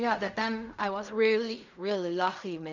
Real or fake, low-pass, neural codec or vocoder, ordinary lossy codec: fake; 7.2 kHz; codec, 16 kHz in and 24 kHz out, 0.4 kbps, LongCat-Audio-Codec, fine tuned four codebook decoder; none